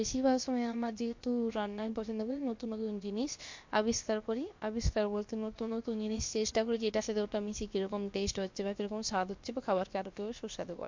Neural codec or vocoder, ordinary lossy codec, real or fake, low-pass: codec, 16 kHz, about 1 kbps, DyCAST, with the encoder's durations; MP3, 48 kbps; fake; 7.2 kHz